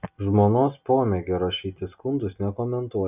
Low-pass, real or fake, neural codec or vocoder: 3.6 kHz; real; none